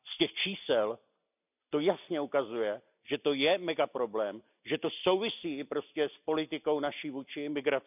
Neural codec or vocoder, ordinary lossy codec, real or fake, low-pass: none; none; real; 3.6 kHz